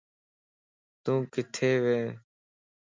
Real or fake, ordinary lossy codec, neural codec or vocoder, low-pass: real; MP3, 64 kbps; none; 7.2 kHz